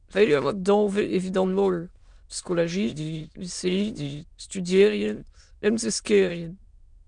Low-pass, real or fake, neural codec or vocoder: 9.9 kHz; fake; autoencoder, 22.05 kHz, a latent of 192 numbers a frame, VITS, trained on many speakers